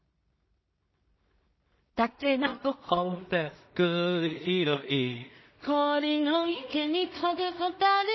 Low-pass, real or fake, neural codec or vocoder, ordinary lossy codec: 7.2 kHz; fake; codec, 16 kHz in and 24 kHz out, 0.4 kbps, LongCat-Audio-Codec, two codebook decoder; MP3, 24 kbps